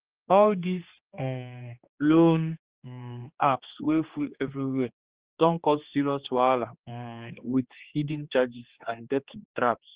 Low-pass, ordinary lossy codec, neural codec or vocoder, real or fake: 3.6 kHz; Opus, 24 kbps; codec, 16 kHz, 2 kbps, X-Codec, HuBERT features, trained on general audio; fake